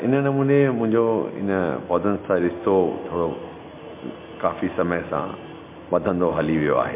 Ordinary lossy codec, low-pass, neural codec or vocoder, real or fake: MP3, 24 kbps; 3.6 kHz; none; real